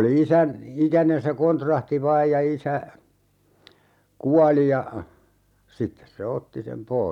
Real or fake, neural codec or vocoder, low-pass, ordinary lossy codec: real; none; 19.8 kHz; none